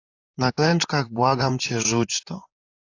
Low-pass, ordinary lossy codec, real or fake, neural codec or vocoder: 7.2 kHz; AAC, 48 kbps; fake; vocoder, 44.1 kHz, 128 mel bands, Pupu-Vocoder